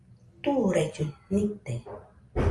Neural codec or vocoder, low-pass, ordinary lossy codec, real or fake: none; 10.8 kHz; Opus, 32 kbps; real